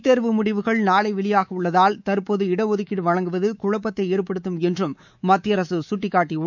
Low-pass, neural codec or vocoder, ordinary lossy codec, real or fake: 7.2 kHz; codec, 24 kHz, 3.1 kbps, DualCodec; none; fake